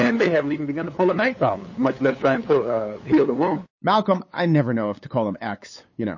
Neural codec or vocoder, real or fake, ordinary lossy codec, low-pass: codec, 16 kHz, 8 kbps, FunCodec, trained on LibriTTS, 25 frames a second; fake; MP3, 32 kbps; 7.2 kHz